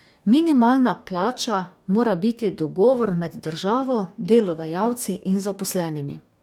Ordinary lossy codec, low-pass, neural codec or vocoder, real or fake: none; 19.8 kHz; codec, 44.1 kHz, 2.6 kbps, DAC; fake